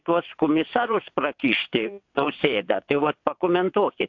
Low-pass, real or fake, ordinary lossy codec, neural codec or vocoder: 7.2 kHz; fake; AAC, 48 kbps; vocoder, 22.05 kHz, 80 mel bands, WaveNeXt